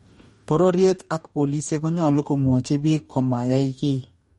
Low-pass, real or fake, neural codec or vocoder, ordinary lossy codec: 19.8 kHz; fake; codec, 44.1 kHz, 2.6 kbps, DAC; MP3, 48 kbps